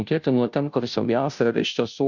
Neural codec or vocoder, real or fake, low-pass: codec, 16 kHz, 0.5 kbps, FunCodec, trained on Chinese and English, 25 frames a second; fake; 7.2 kHz